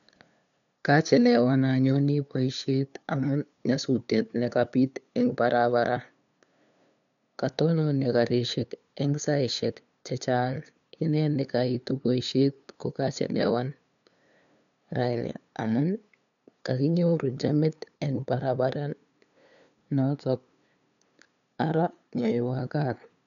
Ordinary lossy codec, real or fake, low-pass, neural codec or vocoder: none; fake; 7.2 kHz; codec, 16 kHz, 2 kbps, FunCodec, trained on LibriTTS, 25 frames a second